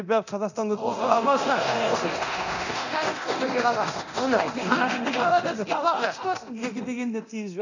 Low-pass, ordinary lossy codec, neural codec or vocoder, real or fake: 7.2 kHz; none; codec, 24 kHz, 0.9 kbps, DualCodec; fake